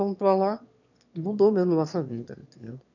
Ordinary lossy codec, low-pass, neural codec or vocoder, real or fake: none; 7.2 kHz; autoencoder, 22.05 kHz, a latent of 192 numbers a frame, VITS, trained on one speaker; fake